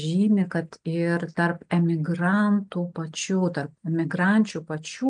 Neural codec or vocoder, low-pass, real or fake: none; 9.9 kHz; real